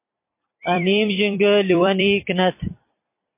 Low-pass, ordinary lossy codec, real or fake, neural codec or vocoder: 3.6 kHz; MP3, 24 kbps; fake; vocoder, 44.1 kHz, 128 mel bands every 256 samples, BigVGAN v2